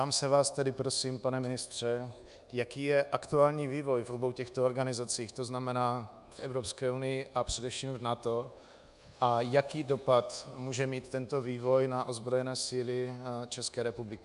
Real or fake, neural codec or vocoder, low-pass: fake; codec, 24 kHz, 1.2 kbps, DualCodec; 10.8 kHz